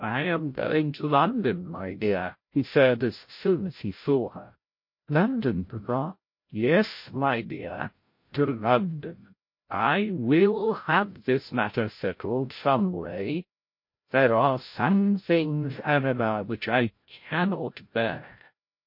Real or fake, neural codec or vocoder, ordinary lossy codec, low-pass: fake; codec, 16 kHz, 0.5 kbps, FreqCodec, larger model; MP3, 32 kbps; 5.4 kHz